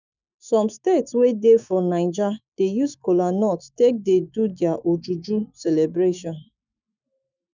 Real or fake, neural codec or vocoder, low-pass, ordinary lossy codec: fake; autoencoder, 48 kHz, 128 numbers a frame, DAC-VAE, trained on Japanese speech; 7.2 kHz; none